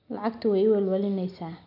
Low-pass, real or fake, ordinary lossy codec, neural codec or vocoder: 5.4 kHz; real; none; none